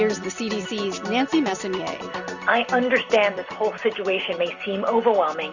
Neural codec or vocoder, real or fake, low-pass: none; real; 7.2 kHz